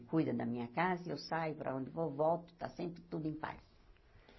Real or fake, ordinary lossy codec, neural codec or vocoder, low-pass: real; MP3, 24 kbps; none; 7.2 kHz